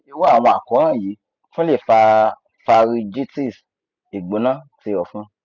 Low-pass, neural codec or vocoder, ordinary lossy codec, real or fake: 7.2 kHz; none; none; real